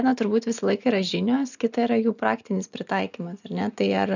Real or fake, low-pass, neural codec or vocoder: real; 7.2 kHz; none